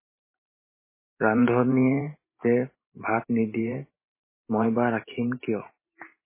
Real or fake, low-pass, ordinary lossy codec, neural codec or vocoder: fake; 3.6 kHz; MP3, 16 kbps; vocoder, 44.1 kHz, 128 mel bands every 512 samples, BigVGAN v2